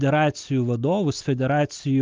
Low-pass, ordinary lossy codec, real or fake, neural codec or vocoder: 7.2 kHz; Opus, 32 kbps; real; none